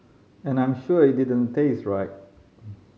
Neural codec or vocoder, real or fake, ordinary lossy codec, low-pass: none; real; none; none